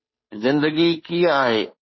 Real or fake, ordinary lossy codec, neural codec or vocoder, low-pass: fake; MP3, 24 kbps; codec, 16 kHz, 2 kbps, FunCodec, trained on Chinese and English, 25 frames a second; 7.2 kHz